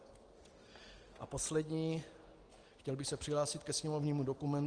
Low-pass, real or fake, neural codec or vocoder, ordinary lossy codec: 9.9 kHz; real; none; Opus, 24 kbps